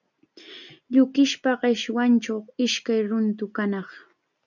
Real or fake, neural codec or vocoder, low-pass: real; none; 7.2 kHz